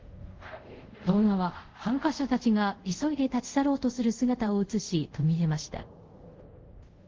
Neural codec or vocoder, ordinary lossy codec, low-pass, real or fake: codec, 24 kHz, 0.5 kbps, DualCodec; Opus, 16 kbps; 7.2 kHz; fake